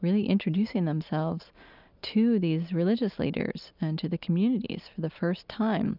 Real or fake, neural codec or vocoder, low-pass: real; none; 5.4 kHz